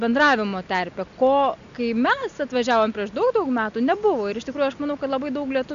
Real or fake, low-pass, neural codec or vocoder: real; 7.2 kHz; none